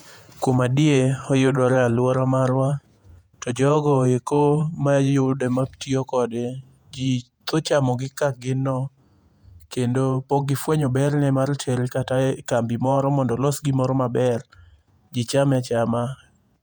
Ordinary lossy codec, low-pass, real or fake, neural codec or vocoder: none; 19.8 kHz; fake; vocoder, 48 kHz, 128 mel bands, Vocos